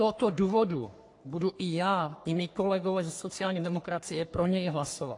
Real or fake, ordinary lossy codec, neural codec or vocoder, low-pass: fake; AAC, 48 kbps; codec, 44.1 kHz, 3.4 kbps, Pupu-Codec; 10.8 kHz